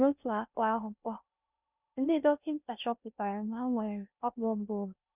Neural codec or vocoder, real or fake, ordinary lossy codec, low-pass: codec, 16 kHz in and 24 kHz out, 0.6 kbps, FocalCodec, streaming, 2048 codes; fake; none; 3.6 kHz